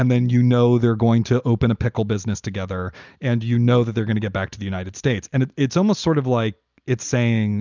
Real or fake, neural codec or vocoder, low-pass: real; none; 7.2 kHz